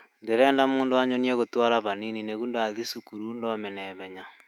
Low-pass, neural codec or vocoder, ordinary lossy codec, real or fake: 19.8 kHz; autoencoder, 48 kHz, 128 numbers a frame, DAC-VAE, trained on Japanese speech; none; fake